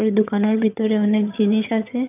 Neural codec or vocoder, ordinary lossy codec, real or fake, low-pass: vocoder, 22.05 kHz, 80 mel bands, HiFi-GAN; none; fake; 3.6 kHz